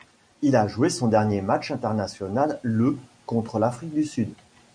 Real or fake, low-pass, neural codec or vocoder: real; 9.9 kHz; none